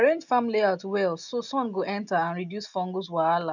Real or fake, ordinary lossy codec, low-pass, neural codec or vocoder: real; none; 7.2 kHz; none